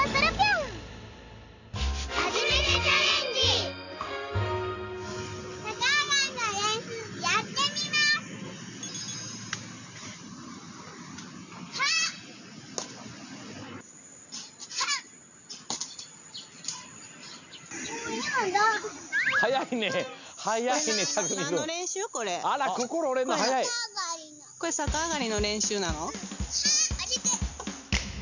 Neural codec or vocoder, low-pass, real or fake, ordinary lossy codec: none; 7.2 kHz; real; none